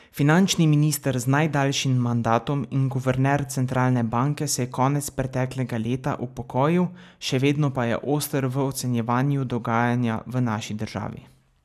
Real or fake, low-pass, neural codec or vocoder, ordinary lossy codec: real; 14.4 kHz; none; none